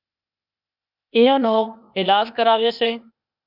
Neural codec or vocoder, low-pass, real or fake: codec, 16 kHz, 0.8 kbps, ZipCodec; 5.4 kHz; fake